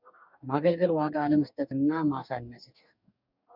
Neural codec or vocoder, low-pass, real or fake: codec, 44.1 kHz, 2.6 kbps, DAC; 5.4 kHz; fake